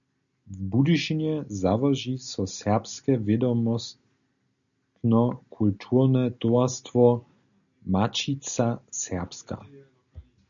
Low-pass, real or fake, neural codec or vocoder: 7.2 kHz; real; none